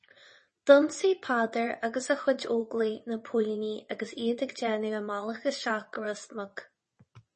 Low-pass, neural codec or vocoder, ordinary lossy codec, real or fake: 9.9 kHz; vocoder, 22.05 kHz, 80 mel bands, WaveNeXt; MP3, 32 kbps; fake